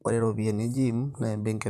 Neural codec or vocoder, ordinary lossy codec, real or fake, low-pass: vocoder, 44.1 kHz, 128 mel bands, Pupu-Vocoder; none; fake; 14.4 kHz